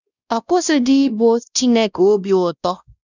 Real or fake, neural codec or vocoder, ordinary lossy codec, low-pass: fake; codec, 16 kHz, 1 kbps, X-Codec, WavLM features, trained on Multilingual LibriSpeech; none; 7.2 kHz